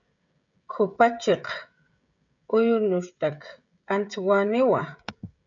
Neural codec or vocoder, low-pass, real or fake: codec, 16 kHz, 16 kbps, FreqCodec, smaller model; 7.2 kHz; fake